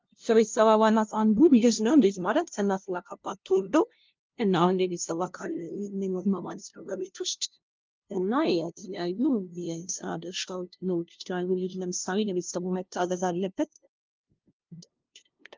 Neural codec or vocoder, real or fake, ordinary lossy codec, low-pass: codec, 16 kHz, 0.5 kbps, FunCodec, trained on LibriTTS, 25 frames a second; fake; Opus, 32 kbps; 7.2 kHz